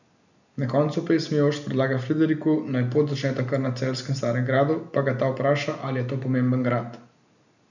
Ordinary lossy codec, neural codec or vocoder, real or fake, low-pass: none; none; real; 7.2 kHz